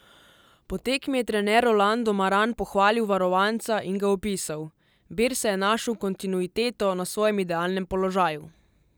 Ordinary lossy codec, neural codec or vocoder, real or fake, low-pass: none; none; real; none